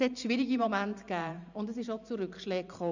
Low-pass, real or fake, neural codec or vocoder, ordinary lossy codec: 7.2 kHz; fake; vocoder, 44.1 kHz, 128 mel bands every 512 samples, BigVGAN v2; none